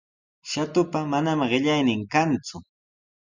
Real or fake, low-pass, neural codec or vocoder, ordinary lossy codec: real; 7.2 kHz; none; Opus, 64 kbps